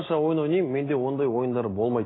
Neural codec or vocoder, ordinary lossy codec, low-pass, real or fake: none; AAC, 16 kbps; 7.2 kHz; real